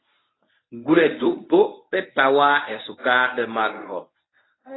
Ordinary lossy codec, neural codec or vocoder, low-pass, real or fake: AAC, 16 kbps; codec, 24 kHz, 0.9 kbps, WavTokenizer, medium speech release version 1; 7.2 kHz; fake